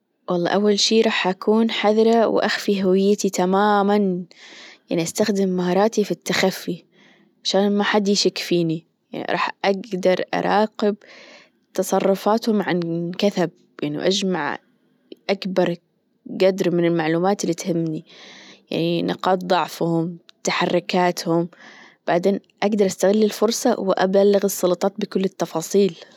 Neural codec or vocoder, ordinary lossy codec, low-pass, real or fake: none; none; 19.8 kHz; real